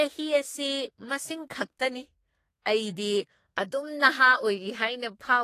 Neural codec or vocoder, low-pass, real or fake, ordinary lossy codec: codec, 32 kHz, 1.9 kbps, SNAC; 14.4 kHz; fake; AAC, 48 kbps